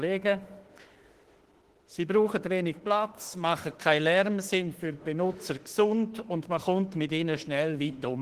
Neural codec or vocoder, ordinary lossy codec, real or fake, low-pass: autoencoder, 48 kHz, 32 numbers a frame, DAC-VAE, trained on Japanese speech; Opus, 16 kbps; fake; 14.4 kHz